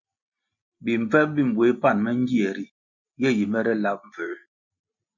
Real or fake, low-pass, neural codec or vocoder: real; 7.2 kHz; none